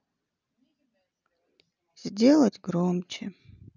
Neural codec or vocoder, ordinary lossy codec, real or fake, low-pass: none; none; real; 7.2 kHz